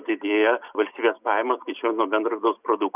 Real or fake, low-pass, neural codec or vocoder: real; 3.6 kHz; none